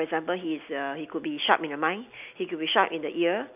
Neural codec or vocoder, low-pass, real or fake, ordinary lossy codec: none; 3.6 kHz; real; none